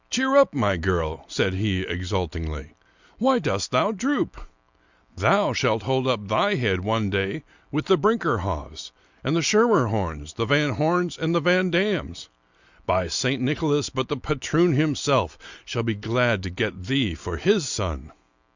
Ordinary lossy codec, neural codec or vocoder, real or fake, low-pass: Opus, 64 kbps; none; real; 7.2 kHz